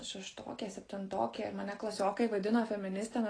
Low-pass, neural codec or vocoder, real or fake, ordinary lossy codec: 9.9 kHz; none; real; AAC, 32 kbps